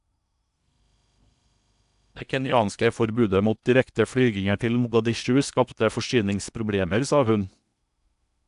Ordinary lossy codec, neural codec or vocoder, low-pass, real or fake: none; codec, 16 kHz in and 24 kHz out, 0.8 kbps, FocalCodec, streaming, 65536 codes; 10.8 kHz; fake